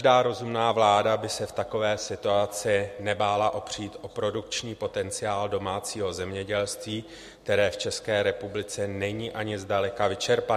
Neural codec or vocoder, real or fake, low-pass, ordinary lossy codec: none; real; 14.4 kHz; MP3, 64 kbps